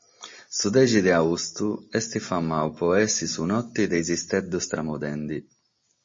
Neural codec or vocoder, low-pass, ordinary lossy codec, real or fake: none; 7.2 kHz; MP3, 32 kbps; real